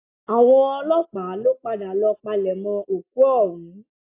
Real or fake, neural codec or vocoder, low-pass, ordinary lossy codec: real; none; 3.6 kHz; AAC, 24 kbps